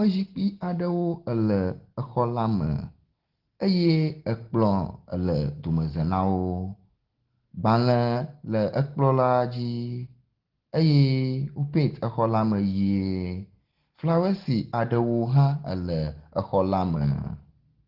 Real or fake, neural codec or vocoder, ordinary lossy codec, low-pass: real; none; Opus, 16 kbps; 5.4 kHz